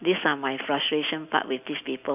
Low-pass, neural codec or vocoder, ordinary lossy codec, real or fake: 3.6 kHz; none; none; real